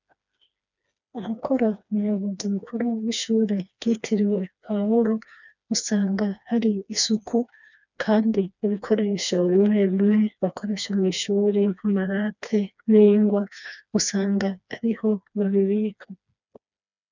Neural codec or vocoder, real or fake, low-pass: codec, 16 kHz, 2 kbps, FreqCodec, smaller model; fake; 7.2 kHz